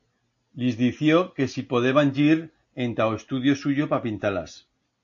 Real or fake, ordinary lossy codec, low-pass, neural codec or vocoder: real; AAC, 48 kbps; 7.2 kHz; none